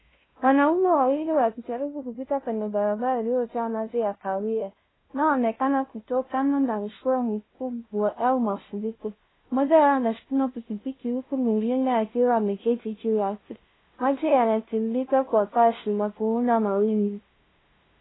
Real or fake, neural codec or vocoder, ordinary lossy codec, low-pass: fake; codec, 16 kHz, 0.5 kbps, FunCodec, trained on LibriTTS, 25 frames a second; AAC, 16 kbps; 7.2 kHz